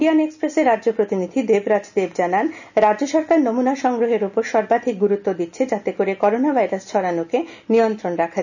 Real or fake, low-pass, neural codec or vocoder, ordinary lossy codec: real; 7.2 kHz; none; none